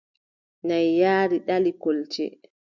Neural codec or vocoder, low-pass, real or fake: none; 7.2 kHz; real